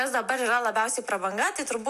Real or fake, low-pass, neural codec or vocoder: real; 14.4 kHz; none